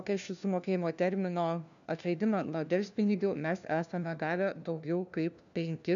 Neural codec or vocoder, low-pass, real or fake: codec, 16 kHz, 1 kbps, FunCodec, trained on LibriTTS, 50 frames a second; 7.2 kHz; fake